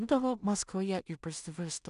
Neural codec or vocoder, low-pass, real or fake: codec, 16 kHz in and 24 kHz out, 0.4 kbps, LongCat-Audio-Codec, two codebook decoder; 10.8 kHz; fake